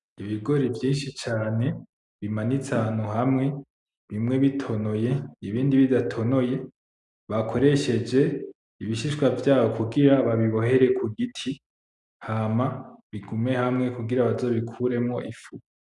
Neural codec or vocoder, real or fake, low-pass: none; real; 10.8 kHz